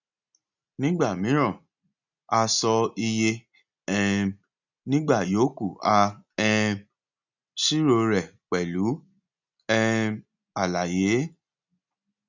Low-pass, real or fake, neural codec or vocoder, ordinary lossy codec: 7.2 kHz; real; none; none